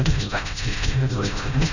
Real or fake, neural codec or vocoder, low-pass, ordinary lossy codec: fake; codec, 16 kHz, 0.5 kbps, FreqCodec, smaller model; 7.2 kHz; none